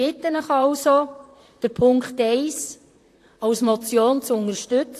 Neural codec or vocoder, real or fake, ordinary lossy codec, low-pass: vocoder, 44.1 kHz, 128 mel bands, Pupu-Vocoder; fake; AAC, 64 kbps; 14.4 kHz